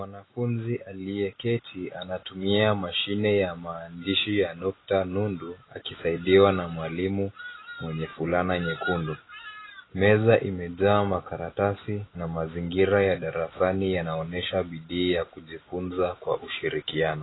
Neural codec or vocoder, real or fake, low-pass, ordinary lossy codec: none; real; 7.2 kHz; AAC, 16 kbps